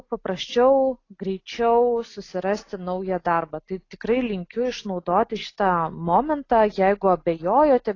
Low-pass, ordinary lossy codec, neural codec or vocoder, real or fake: 7.2 kHz; AAC, 32 kbps; none; real